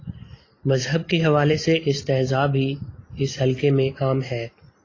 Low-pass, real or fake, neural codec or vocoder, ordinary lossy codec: 7.2 kHz; real; none; AAC, 32 kbps